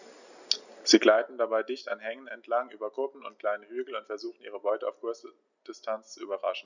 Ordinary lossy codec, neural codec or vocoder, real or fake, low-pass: none; none; real; 7.2 kHz